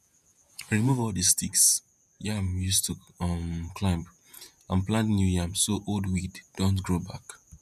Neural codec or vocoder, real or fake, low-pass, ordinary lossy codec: vocoder, 48 kHz, 128 mel bands, Vocos; fake; 14.4 kHz; none